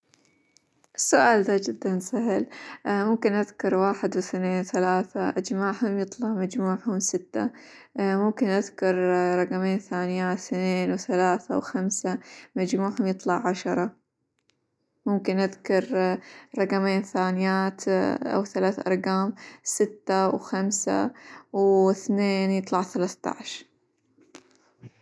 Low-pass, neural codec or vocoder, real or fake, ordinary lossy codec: none; none; real; none